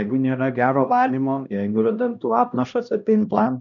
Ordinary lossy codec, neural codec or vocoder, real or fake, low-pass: MP3, 96 kbps; codec, 16 kHz, 1 kbps, X-Codec, HuBERT features, trained on LibriSpeech; fake; 7.2 kHz